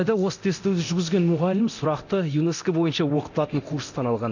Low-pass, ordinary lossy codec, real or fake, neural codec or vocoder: 7.2 kHz; none; fake; codec, 24 kHz, 0.9 kbps, DualCodec